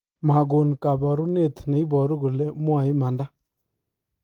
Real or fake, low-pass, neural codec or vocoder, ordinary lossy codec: real; 19.8 kHz; none; Opus, 24 kbps